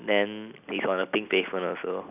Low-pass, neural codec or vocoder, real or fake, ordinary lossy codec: 3.6 kHz; none; real; none